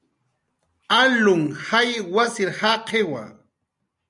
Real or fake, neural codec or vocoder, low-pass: real; none; 10.8 kHz